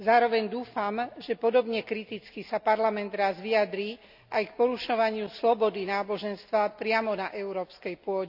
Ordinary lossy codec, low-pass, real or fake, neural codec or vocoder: none; 5.4 kHz; real; none